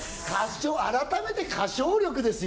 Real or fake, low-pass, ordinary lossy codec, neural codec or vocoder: real; none; none; none